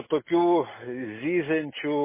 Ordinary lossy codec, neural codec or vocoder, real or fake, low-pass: MP3, 16 kbps; none; real; 3.6 kHz